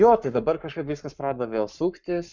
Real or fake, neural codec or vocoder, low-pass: fake; codec, 44.1 kHz, 7.8 kbps, Pupu-Codec; 7.2 kHz